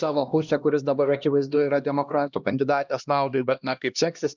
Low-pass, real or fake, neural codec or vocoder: 7.2 kHz; fake; codec, 16 kHz, 1 kbps, X-Codec, HuBERT features, trained on LibriSpeech